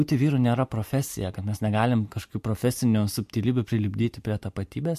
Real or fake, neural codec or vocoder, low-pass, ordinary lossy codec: fake; vocoder, 44.1 kHz, 128 mel bands every 512 samples, BigVGAN v2; 14.4 kHz; MP3, 64 kbps